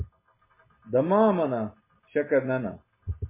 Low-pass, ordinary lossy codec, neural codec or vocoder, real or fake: 3.6 kHz; MP3, 16 kbps; none; real